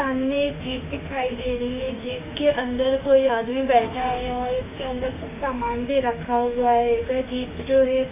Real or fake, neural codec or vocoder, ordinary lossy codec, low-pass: fake; autoencoder, 48 kHz, 32 numbers a frame, DAC-VAE, trained on Japanese speech; AAC, 24 kbps; 3.6 kHz